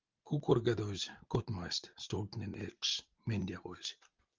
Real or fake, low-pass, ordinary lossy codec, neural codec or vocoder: real; 7.2 kHz; Opus, 24 kbps; none